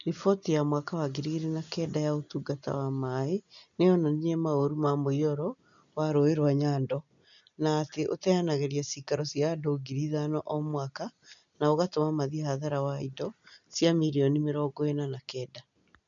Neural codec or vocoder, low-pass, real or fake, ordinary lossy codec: none; 7.2 kHz; real; none